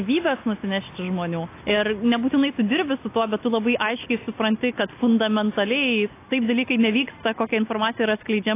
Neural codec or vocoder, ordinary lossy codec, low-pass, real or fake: none; AAC, 24 kbps; 3.6 kHz; real